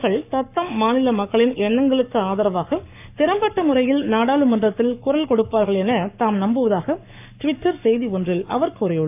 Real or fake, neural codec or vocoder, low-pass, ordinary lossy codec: fake; codec, 44.1 kHz, 7.8 kbps, Pupu-Codec; 3.6 kHz; AAC, 32 kbps